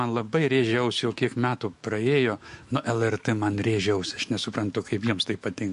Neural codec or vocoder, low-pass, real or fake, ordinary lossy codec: autoencoder, 48 kHz, 128 numbers a frame, DAC-VAE, trained on Japanese speech; 14.4 kHz; fake; MP3, 48 kbps